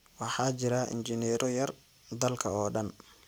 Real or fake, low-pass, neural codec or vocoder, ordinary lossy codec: real; none; none; none